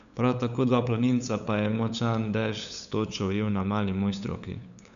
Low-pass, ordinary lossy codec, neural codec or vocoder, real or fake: 7.2 kHz; none; codec, 16 kHz, 8 kbps, FunCodec, trained on LibriTTS, 25 frames a second; fake